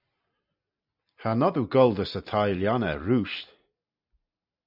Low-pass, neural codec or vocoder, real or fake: 5.4 kHz; none; real